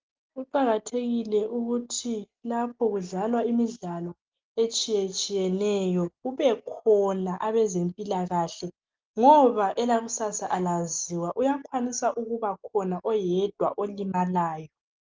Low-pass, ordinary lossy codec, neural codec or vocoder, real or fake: 7.2 kHz; Opus, 32 kbps; none; real